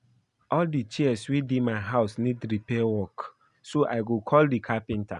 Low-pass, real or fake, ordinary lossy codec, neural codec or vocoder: 10.8 kHz; real; none; none